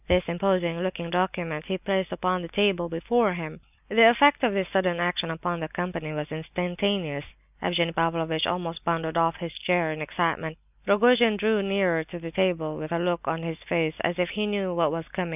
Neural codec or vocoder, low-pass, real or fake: none; 3.6 kHz; real